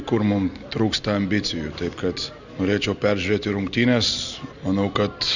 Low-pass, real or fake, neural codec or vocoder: 7.2 kHz; real; none